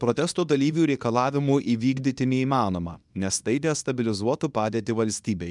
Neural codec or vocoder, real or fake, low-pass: codec, 24 kHz, 0.9 kbps, WavTokenizer, small release; fake; 10.8 kHz